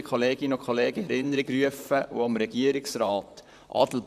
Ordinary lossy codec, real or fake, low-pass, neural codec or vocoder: none; fake; 14.4 kHz; vocoder, 44.1 kHz, 128 mel bands, Pupu-Vocoder